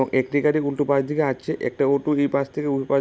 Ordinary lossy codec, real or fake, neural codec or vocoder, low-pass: none; real; none; none